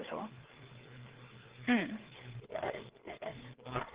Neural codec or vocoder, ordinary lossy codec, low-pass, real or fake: codec, 16 kHz, 4 kbps, FreqCodec, larger model; Opus, 16 kbps; 3.6 kHz; fake